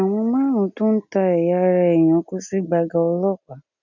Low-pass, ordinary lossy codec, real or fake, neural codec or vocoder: 7.2 kHz; none; real; none